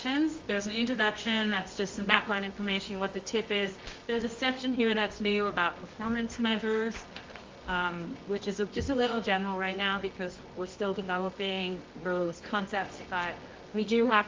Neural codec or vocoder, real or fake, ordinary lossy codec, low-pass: codec, 24 kHz, 0.9 kbps, WavTokenizer, medium music audio release; fake; Opus, 32 kbps; 7.2 kHz